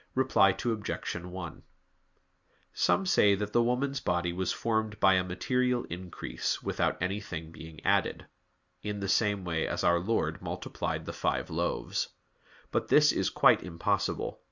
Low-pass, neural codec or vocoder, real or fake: 7.2 kHz; none; real